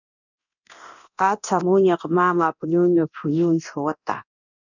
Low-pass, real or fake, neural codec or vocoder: 7.2 kHz; fake; codec, 24 kHz, 0.9 kbps, DualCodec